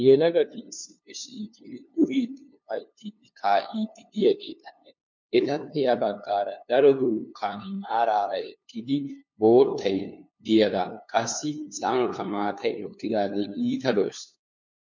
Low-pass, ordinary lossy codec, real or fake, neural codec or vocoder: 7.2 kHz; MP3, 48 kbps; fake; codec, 16 kHz, 2 kbps, FunCodec, trained on LibriTTS, 25 frames a second